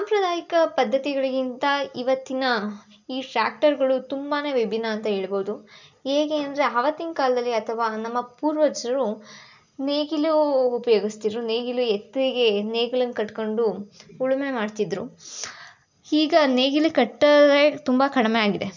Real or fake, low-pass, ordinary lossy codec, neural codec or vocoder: real; 7.2 kHz; none; none